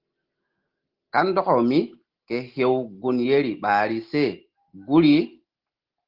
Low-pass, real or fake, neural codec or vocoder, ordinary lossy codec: 5.4 kHz; real; none; Opus, 16 kbps